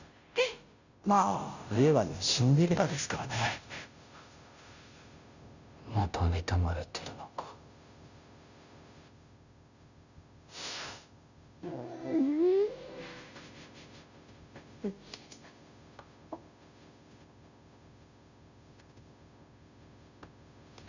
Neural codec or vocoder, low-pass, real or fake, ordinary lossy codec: codec, 16 kHz, 0.5 kbps, FunCodec, trained on Chinese and English, 25 frames a second; 7.2 kHz; fake; none